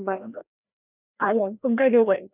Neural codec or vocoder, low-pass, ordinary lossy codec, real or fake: codec, 16 kHz, 1 kbps, FreqCodec, larger model; 3.6 kHz; none; fake